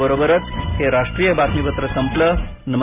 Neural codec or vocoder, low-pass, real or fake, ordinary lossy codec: none; 3.6 kHz; real; AAC, 32 kbps